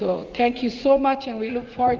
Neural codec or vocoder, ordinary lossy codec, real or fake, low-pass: vocoder, 44.1 kHz, 80 mel bands, Vocos; Opus, 32 kbps; fake; 7.2 kHz